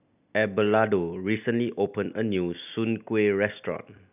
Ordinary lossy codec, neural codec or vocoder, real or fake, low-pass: none; none; real; 3.6 kHz